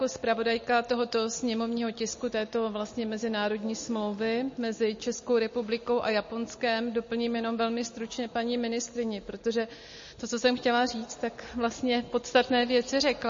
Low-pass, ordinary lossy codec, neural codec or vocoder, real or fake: 7.2 kHz; MP3, 32 kbps; none; real